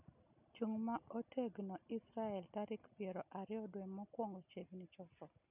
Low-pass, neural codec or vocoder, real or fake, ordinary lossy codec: 3.6 kHz; none; real; none